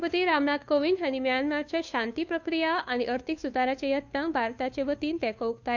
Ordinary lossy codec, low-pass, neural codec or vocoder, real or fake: none; 7.2 kHz; codec, 16 kHz, 2 kbps, FunCodec, trained on Chinese and English, 25 frames a second; fake